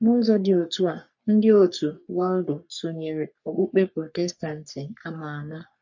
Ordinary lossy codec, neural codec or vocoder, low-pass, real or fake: MP3, 48 kbps; codec, 44.1 kHz, 3.4 kbps, Pupu-Codec; 7.2 kHz; fake